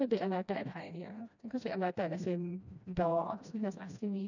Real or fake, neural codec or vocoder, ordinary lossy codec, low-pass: fake; codec, 16 kHz, 1 kbps, FreqCodec, smaller model; none; 7.2 kHz